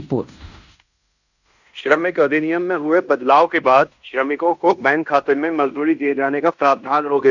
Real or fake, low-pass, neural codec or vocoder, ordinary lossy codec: fake; 7.2 kHz; codec, 16 kHz in and 24 kHz out, 0.9 kbps, LongCat-Audio-Codec, fine tuned four codebook decoder; none